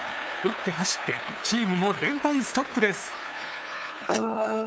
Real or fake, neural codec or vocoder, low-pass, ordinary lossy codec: fake; codec, 16 kHz, 2 kbps, FunCodec, trained on LibriTTS, 25 frames a second; none; none